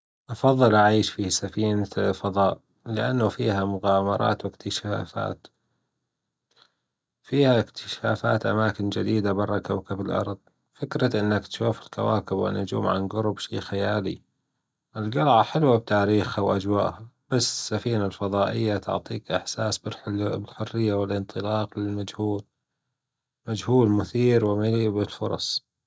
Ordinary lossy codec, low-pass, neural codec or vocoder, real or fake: none; none; none; real